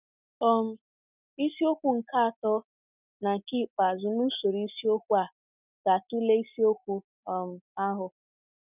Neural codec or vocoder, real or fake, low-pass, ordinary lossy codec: none; real; 3.6 kHz; none